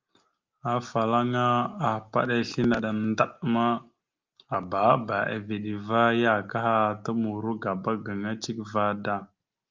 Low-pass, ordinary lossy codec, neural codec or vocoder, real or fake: 7.2 kHz; Opus, 24 kbps; none; real